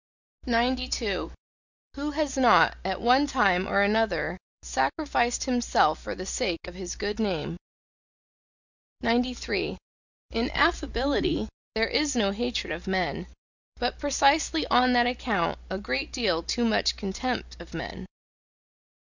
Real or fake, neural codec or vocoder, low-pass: real; none; 7.2 kHz